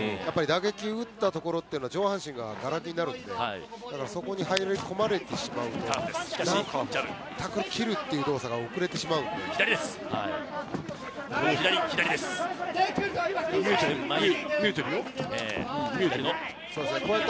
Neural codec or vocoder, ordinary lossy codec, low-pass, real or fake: none; none; none; real